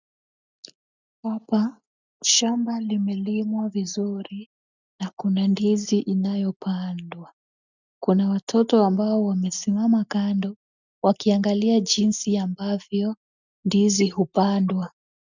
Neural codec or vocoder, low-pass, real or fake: none; 7.2 kHz; real